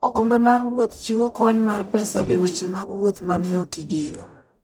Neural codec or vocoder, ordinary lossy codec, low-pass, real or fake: codec, 44.1 kHz, 0.9 kbps, DAC; none; none; fake